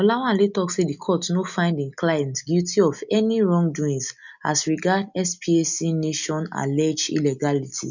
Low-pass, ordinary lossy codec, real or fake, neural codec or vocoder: 7.2 kHz; none; real; none